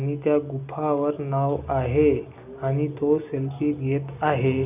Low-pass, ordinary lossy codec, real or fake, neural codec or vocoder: 3.6 kHz; none; real; none